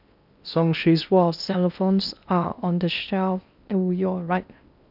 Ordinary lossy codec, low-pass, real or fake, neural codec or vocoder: none; 5.4 kHz; fake; codec, 16 kHz in and 24 kHz out, 0.6 kbps, FocalCodec, streaming, 4096 codes